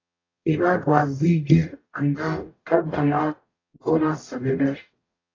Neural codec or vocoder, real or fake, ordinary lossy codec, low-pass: codec, 44.1 kHz, 0.9 kbps, DAC; fake; AAC, 32 kbps; 7.2 kHz